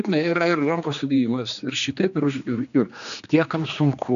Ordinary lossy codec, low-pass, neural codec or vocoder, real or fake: MP3, 96 kbps; 7.2 kHz; codec, 16 kHz, 2 kbps, X-Codec, HuBERT features, trained on general audio; fake